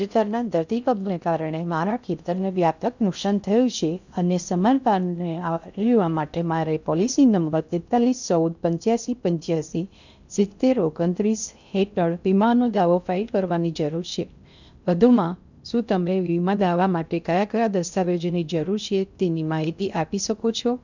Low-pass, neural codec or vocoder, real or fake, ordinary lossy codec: 7.2 kHz; codec, 16 kHz in and 24 kHz out, 0.6 kbps, FocalCodec, streaming, 2048 codes; fake; none